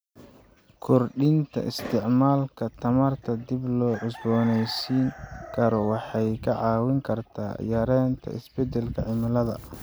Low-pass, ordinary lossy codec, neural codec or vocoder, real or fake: none; none; none; real